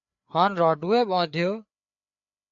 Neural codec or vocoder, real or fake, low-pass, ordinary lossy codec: codec, 16 kHz, 4 kbps, FreqCodec, larger model; fake; 7.2 kHz; AAC, 64 kbps